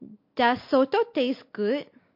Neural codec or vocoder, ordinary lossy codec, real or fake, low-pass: none; MP3, 32 kbps; real; 5.4 kHz